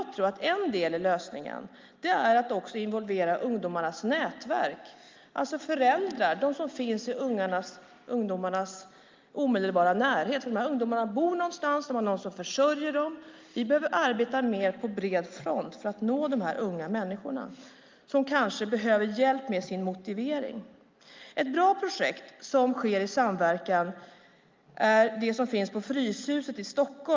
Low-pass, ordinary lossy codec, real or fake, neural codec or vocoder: 7.2 kHz; Opus, 32 kbps; real; none